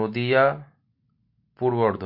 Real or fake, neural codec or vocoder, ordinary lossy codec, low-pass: real; none; MP3, 32 kbps; 5.4 kHz